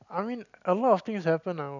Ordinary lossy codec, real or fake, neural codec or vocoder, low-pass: none; real; none; 7.2 kHz